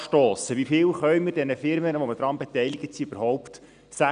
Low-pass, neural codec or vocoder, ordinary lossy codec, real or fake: 9.9 kHz; none; none; real